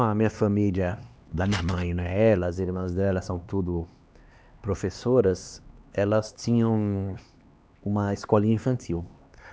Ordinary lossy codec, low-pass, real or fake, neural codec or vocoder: none; none; fake; codec, 16 kHz, 2 kbps, X-Codec, HuBERT features, trained on LibriSpeech